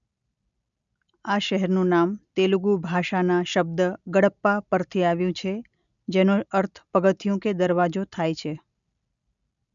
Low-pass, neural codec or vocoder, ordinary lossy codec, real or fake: 7.2 kHz; none; none; real